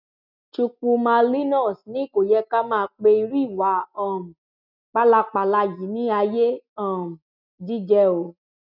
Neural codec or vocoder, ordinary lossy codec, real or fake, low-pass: none; none; real; 5.4 kHz